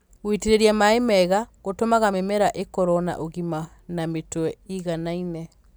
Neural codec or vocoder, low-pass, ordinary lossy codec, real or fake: none; none; none; real